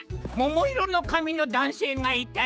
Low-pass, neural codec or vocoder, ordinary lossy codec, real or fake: none; codec, 16 kHz, 4 kbps, X-Codec, HuBERT features, trained on general audio; none; fake